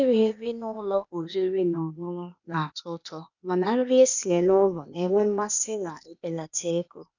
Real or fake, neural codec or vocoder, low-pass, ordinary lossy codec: fake; codec, 16 kHz, 0.8 kbps, ZipCodec; 7.2 kHz; none